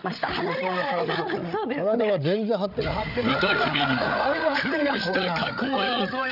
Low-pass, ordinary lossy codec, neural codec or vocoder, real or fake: 5.4 kHz; none; codec, 16 kHz, 8 kbps, FreqCodec, larger model; fake